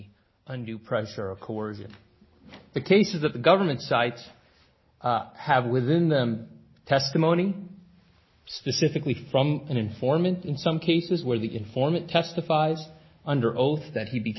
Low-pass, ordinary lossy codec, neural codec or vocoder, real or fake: 7.2 kHz; MP3, 24 kbps; none; real